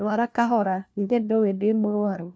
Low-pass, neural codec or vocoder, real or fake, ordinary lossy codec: none; codec, 16 kHz, 1 kbps, FunCodec, trained on LibriTTS, 50 frames a second; fake; none